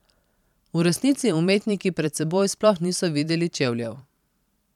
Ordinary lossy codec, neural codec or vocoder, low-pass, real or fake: none; vocoder, 44.1 kHz, 128 mel bands every 512 samples, BigVGAN v2; 19.8 kHz; fake